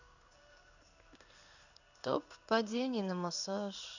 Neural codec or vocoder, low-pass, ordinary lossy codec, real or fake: codec, 16 kHz in and 24 kHz out, 1 kbps, XY-Tokenizer; 7.2 kHz; none; fake